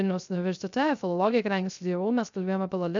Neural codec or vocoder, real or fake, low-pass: codec, 16 kHz, 0.3 kbps, FocalCodec; fake; 7.2 kHz